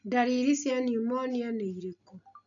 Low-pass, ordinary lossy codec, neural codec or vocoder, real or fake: 7.2 kHz; none; none; real